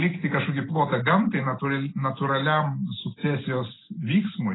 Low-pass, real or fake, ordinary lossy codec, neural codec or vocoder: 7.2 kHz; real; AAC, 16 kbps; none